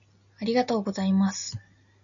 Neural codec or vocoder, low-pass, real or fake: none; 7.2 kHz; real